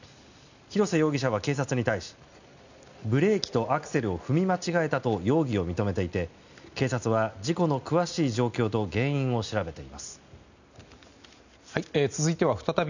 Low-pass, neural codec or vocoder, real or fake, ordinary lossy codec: 7.2 kHz; none; real; none